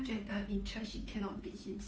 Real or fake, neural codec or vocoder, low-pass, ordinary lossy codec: fake; codec, 16 kHz, 2 kbps, FunCodec, trained on Chinese and English, 25 frames a second; none; none